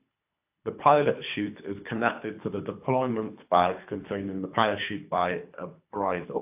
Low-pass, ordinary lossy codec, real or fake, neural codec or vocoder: 3.6 kHz; AAC, 32 kbps; fake; codec, 24 kHz, 3 kbps, HILCodec